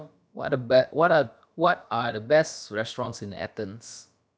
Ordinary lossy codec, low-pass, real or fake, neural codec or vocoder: none; none; fake; codec, 16 kHz, about 1 kbps, DyCAST, with the encoder's durations